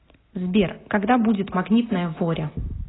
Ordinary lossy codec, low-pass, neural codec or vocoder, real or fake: AAC, 16 kbps; 7.2 kHz; none; real